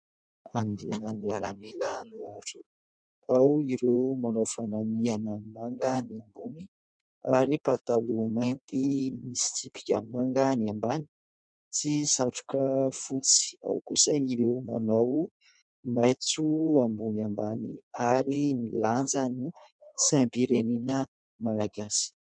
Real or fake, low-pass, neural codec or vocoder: fake; 9.9 kHz; codec, 16 kHz in and 24 kHz out, 1.1 kbps, FireRedTTS-2 codec